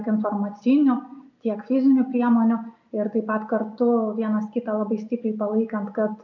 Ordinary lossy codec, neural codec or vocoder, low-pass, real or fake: MP3, 64 kbps; none; 7.2 kHz; real